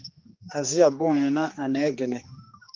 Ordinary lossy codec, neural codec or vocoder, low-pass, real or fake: Opus, 32 kbps; codec, 16 kHz, 2 kbps, X-Codec, HuBERT features, trained on general audio; 7.2 kHz; fake